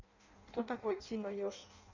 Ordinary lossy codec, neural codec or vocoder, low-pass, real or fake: none; codec, 16 kHz in and 24 kHz out, 0.6 kbps, FireRedTTS-2 codec; 7.2 kHz; fake